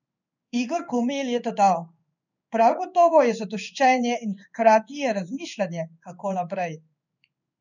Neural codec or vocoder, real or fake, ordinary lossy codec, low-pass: codec, 16 kHz in and 24 kHz out, 1 kbps, XY-Tokenizer; fake; none; 7.2 kHz